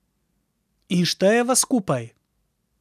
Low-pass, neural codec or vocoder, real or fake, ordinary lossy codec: 14.4 kHz; none; real; none